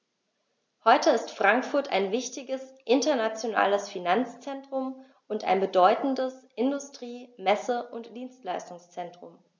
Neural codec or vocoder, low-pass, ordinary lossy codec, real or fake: none; none; none; real